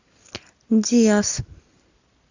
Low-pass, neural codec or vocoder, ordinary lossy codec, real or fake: 7.2 kHz; none; AAC, 48 kbps; real